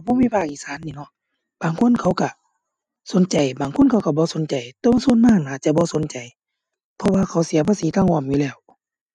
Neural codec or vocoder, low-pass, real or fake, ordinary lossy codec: none; 10.8 kHz; real; none